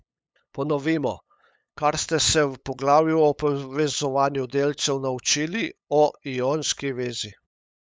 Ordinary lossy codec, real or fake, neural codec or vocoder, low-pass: none; fake; codec, 16 kHz, 8 kbps, FunCodec, trained on LibriTTS, 25 frames a second; none